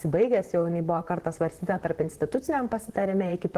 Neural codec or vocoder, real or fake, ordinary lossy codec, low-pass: vocoder, 44.1 kHz, 128 mel bands, Pupu-Vocoder; fake; Opus, 16 kbps; 14.4 kHz